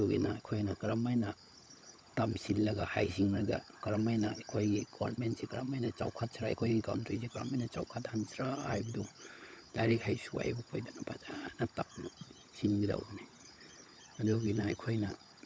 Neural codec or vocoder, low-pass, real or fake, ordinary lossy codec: codec, 16 kHz, 16 kbps, FunCodec, trained on LibriTTS, 50 frames a second; none; fake; none